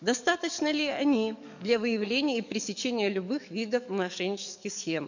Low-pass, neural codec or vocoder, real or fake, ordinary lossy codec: 7.2 kHz; codec, 44.1 kHz, 7.8 kbps, DAC; fake; none